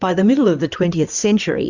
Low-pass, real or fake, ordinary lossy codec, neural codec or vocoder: 7.2 kHz; fake; Opus, 64 kbps; codec, 16 kHz in and 24 kHz out, 2.2 kbps, FireRedTTS-2 codec